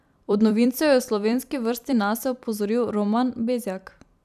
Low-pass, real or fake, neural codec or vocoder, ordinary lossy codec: 14.4 kHz; fake; vocoder, 44.1 kHz, 128 mel bands every 256 samples, BigVGAN v2; none